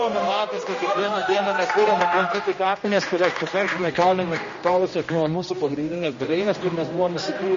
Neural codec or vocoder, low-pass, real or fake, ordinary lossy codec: codec, 16 kHz, 1 kbps, X-Codec, HuBERT features, trained on general audio; 7.2 kHz; fake; MP3, 32 kbps